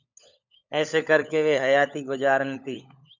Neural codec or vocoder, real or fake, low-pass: codec, 16 kHz, 16 kbps, FunCodec, trained on LibriTTS, 50 frames a second; fake; 7.2 kHz